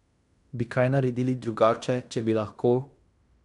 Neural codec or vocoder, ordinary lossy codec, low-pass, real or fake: codec, 16 kHz in and 24 kHz out, 0.9 kbps, LongCat-Audio-Codec, fine tuned four codebook decoder; none; 10.8 kHz; fake